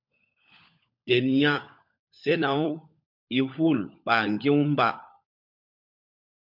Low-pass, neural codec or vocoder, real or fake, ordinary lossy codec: 5.4 kHz; codec, 16 kHz, 16 kbps, FunCodec, trained on LibriTTS, 50 frames a second; fake; MP3, 48 kbps